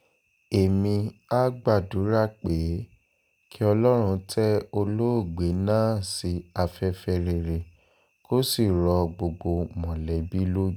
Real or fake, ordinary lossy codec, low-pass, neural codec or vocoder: real; none; none; none